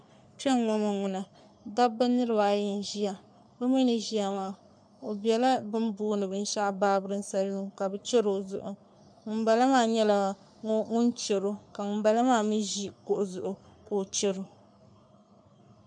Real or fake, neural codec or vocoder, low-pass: fake; codec, 44.1 kHz, 3.4 kbps, Pupu-Codec; 9.9 kHz